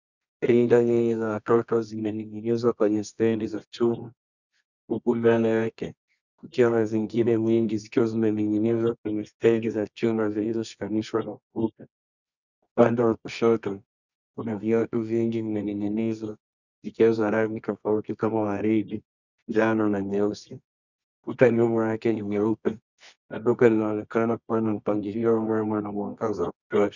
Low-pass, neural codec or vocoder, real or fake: 7.2 kHz; codec, 24 kHz, 0.9 kbps, WavTokenizer, medium music audio release; fake